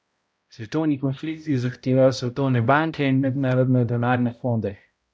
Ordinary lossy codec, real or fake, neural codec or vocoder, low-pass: none; fake; codec, 16 kHz, 0.5 kbps, X-Codec, HuBERT features, trained on balanced general audio; none